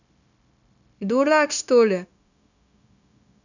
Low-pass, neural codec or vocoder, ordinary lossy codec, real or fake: 7.2 kHz; codec, 16 kHz, 0.9 kbps, LongCat-Audio-Codec; none; fake